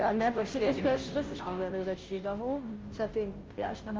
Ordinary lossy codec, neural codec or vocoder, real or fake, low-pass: Opus, 24 kbps; codec, 16 kHz, 0.5 kbps, FunCodec, trained on Chinese and English, 25 frames a second; fake; 7.2 kHz